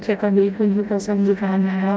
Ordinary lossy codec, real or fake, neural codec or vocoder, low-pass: none; fake; codec, 16 kHz, 0.5 kbps, FreqCodec, smaller model; none